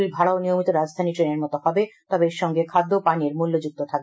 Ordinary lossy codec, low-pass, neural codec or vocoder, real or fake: none; none; none; real